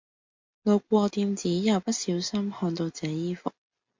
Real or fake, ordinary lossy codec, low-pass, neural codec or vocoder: real; MP3, 48 kbps; 7.2 kHz; none